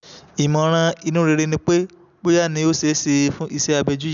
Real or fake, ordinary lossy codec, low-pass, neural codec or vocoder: real; none; 7.2 kHz; none